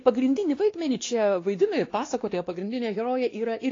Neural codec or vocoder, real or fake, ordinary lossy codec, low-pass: codec, 16 kHz, 2 kbps, X-Codec, WavLM features, trained on Multilingual LibriSpeech; fake; AAC, 32 kbps; 7.2 kHz